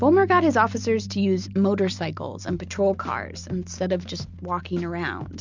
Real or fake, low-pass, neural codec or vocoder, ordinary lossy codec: real; 7.2 kHz; none; MP3, 64 kbps